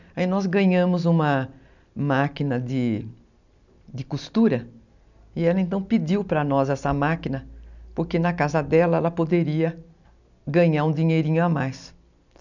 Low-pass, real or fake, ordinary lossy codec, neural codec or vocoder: 7.2 kHz; real; none; none